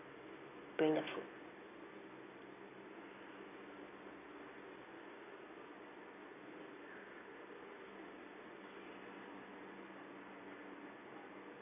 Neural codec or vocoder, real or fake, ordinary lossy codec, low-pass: none; real; none; 3.6 kHz